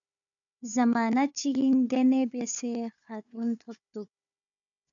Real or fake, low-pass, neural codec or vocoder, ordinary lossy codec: fake; 7.2 kHz; codec, 16 kHz, 4 kbps, FunCodec, trained on Chinese and English, 50 frames a second; MP3, 96 kbps